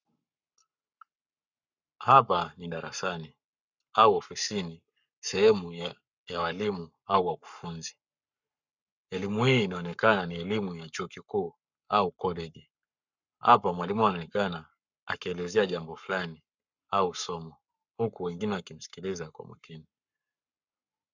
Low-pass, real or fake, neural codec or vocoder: 7.2 kHz; fake; codec, 44.1 kHz, 7.8 kbps, Pupu-Codec